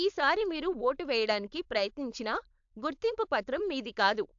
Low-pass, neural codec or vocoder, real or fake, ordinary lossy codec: 7.2 kHz; codec, 16 kHz, 4.8 kbps, FACodec; fake; AAC, 64 kbps